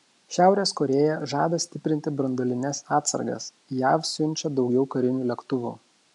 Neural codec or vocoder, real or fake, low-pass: none; real; 10.8 kHz